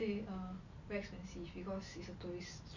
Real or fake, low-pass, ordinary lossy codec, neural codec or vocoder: real; 7.2 kHz; none; none